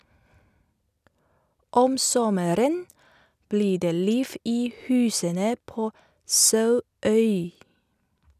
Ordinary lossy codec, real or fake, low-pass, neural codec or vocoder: none; real; 14.4 kHz; none